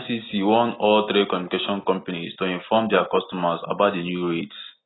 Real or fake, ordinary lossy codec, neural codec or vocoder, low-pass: real; AAC, 16 kbps; none; 7.2 kHz